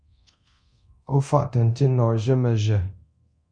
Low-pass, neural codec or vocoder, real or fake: 9.9 kHz; codec, 24 kHz, 0.9 kbps, DualCodec; fake